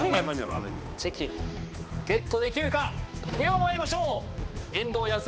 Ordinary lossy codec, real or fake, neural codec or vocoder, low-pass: none; fake; codec, 16 kHz, 2 kbps, X-Codec, HuBERT features, trained on balanced general audio; none